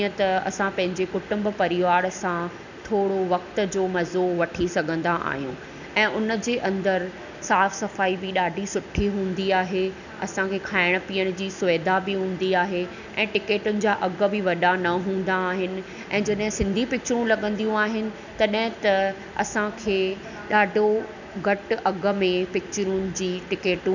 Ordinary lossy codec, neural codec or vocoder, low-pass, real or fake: none; none; 7.2 kHz; real